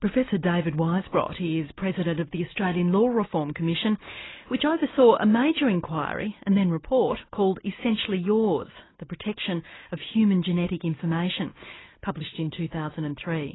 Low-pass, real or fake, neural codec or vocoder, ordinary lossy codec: 7.2 kHz; real; none; AAC, 16 kbps